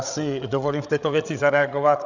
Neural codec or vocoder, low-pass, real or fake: codec, 16 kHz, 16 kbps, FreqCodec, larger model; 7.2 kHz; fake